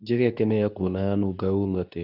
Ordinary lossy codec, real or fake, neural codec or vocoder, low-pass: none; fake; codec, 24 kHz, 0.9 kbps, WavTokenizer, medium speech release version 2; 5.4 kHz